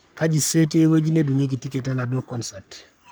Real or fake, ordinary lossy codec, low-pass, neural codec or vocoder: fake; none; none; codec, 44.1 kHz, 3.4 kbps, Pupu-Codec